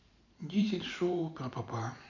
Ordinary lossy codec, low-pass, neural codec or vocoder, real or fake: none; 7.2 kHz; vocoder, 44.1 kHz, 128 mel bands every 512 samples, BigVGAN v2; fake